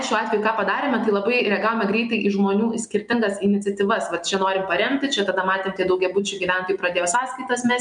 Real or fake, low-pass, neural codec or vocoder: real; 9.9 kHz; none